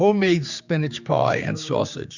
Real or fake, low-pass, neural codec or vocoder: fake; 7.2 kHz; codec, 16 kHz, 4 kbps, X-Codec, HuBERT features, trained on general audio